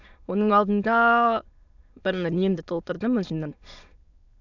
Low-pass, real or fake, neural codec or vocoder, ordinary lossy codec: 7.2 kHz; fake; autoencoder, 22.05 kHz, a latent of 192 numbers a frame, VITS, trained on many speakers; none